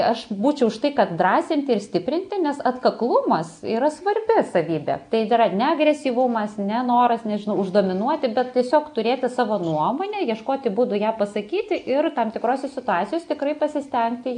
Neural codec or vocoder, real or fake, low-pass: none; real; 9.9 kHz